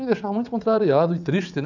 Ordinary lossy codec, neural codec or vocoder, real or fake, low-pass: none; vocoder, 44.1 kHz, 80 mel bands, Vocos; fake; 7.2 kHz